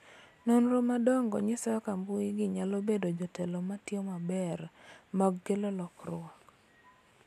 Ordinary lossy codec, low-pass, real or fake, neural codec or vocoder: none; 14.4 kHz; real; none